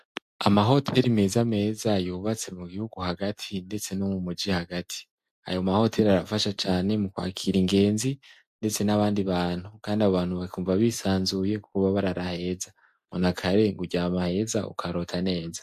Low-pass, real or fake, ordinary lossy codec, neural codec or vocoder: 14.4 kHz; real; MP3, 64 kbps; none